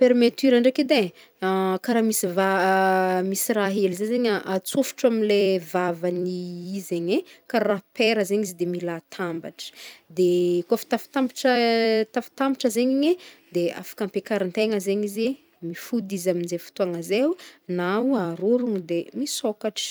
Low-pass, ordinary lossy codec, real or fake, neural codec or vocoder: none; none; fake; vocoder, 44.1 kHz, 128 mel bands every 256 samples, BigVGAN v2